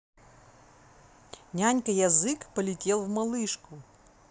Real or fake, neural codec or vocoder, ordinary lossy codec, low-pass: real; none; none; none